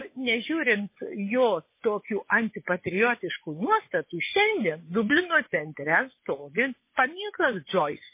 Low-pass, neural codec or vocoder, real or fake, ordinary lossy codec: 3.6 kHz; vocoder, 24 kHz, 100 mel bands, Vocos; fake; MP3, 24 kbps